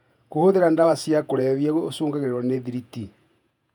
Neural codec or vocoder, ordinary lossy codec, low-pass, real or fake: vocoder, 48 kHz, 128 mel bands, Vocos; none; 19.8 kHz; fake